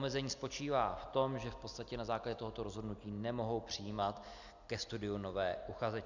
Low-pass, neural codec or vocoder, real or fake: 7.2 kHz; none; real